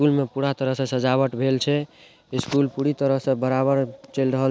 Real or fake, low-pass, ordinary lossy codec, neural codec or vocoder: real; none; none; none